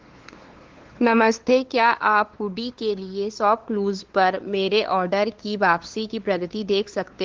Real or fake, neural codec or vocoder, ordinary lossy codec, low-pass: fake; codec, 16 kHz, 2 kbps, FunCodec, trained on LibriTTS, 25 frames a second; Opus, 16 kbps; 7.2 kHz